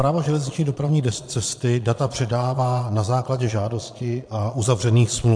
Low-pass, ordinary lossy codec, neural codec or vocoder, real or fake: 9.9 kHz; MP3, 64 kbps; vocoder, 22.05 kHz, 80 mel bands, Vocos; fake